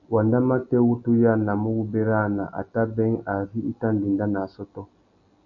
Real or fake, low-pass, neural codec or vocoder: real; 7.2 kHz; none